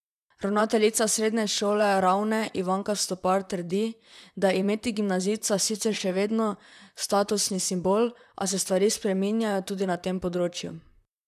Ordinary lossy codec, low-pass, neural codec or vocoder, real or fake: none; 14.4 kHz; vocoder, 44.1 kHz, 128 mel bands, Pupu-Vocoder; fake